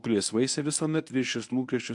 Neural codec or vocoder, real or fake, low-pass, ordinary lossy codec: codec, 24 kHz, 0.9 kbps, WavTokenizer, medium speech release version 1; fake; 10.8 kHz; AAC, 64 kbps